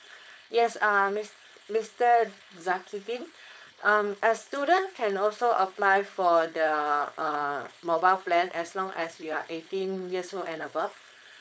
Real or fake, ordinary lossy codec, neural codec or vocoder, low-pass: fake; none; codec, 16 kHz, 4.8 kbps, FACodec; none